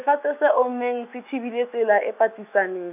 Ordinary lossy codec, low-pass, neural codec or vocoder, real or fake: none; 3.6 kHz; autoencoder, 48 kHz, 128 numbers a frame, DAC-VAE, trained on Japanese speech; fake